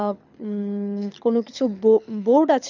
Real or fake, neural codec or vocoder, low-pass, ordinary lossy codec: fake; codec, 24 kHz, 6 kbps, HILCodec; 7.2 kHz; none